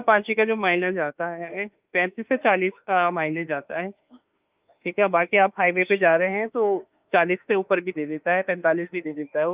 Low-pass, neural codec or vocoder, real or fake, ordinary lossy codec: 3.6 kHz; autoencoder, 48 kHz, 32 numbers a frame, DAC-VAE, trained on Japanese speech; fake; Opus, 24 kbps